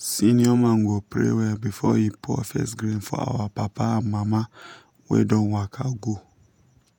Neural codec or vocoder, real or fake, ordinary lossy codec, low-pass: vocoder, 48 kHz, 128 mel bands, Vocos; fake; none; 19.8 kHz